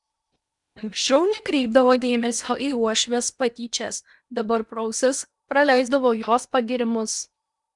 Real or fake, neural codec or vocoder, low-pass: fake; codec, 16 kHz in and 24 kHz out, 0.8 kbps, FocalCodec, streaming, 65536 codes; 10.8 kHz